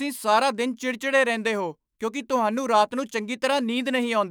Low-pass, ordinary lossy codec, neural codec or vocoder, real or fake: none; none; autoencoder, 48 kHz, 128 numbers a frame, DAC-VAE, trained on Japanese speech; fake